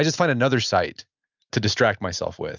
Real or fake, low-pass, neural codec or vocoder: real; 7.2 kHz; none